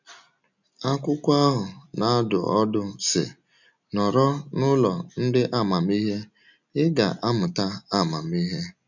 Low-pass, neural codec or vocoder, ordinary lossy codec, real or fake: 7.2 kHz; none; none; real